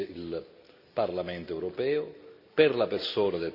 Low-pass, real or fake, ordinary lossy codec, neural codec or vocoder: 5.4 kHz; real; none; none